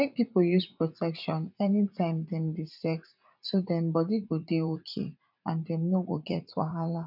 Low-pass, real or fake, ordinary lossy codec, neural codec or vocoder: 5.4 kHz; fake; none; vocoder, 44.1 kHz, 80 mel bands, Vocos